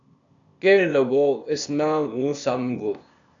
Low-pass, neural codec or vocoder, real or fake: 7.2 kHz; codec, 16 kHz, 0.8 kbps, ZipCodec; fake